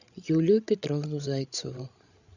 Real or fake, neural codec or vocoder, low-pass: fake; codec, 16 kHz, 16 kbps, FreqCodec, larger model; 7.2 kHz